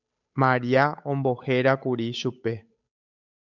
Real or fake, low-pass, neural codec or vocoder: fake; 7.2 kHz; codec, 16 kHz, 8 kbps, FunCodec, trained on Chinese and English, 25 frames a second